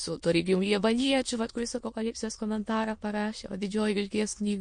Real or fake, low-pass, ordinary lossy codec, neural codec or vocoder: fake; 9.9 kHz; MP3, 48 kbps; autoencoder, 22.05 kHz, a latent of 192 numbers a frame, VITS, trained on many speakers